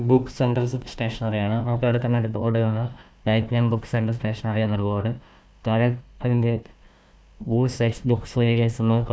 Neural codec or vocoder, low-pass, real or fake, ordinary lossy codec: codec, 16 kHz, 1 kbps, FunCodec, trained on Chinese and English, 50 frames a second; none; fake; none